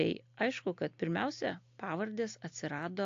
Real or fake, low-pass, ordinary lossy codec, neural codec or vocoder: real; 7.2 kHz; AAC, 48 kbps; none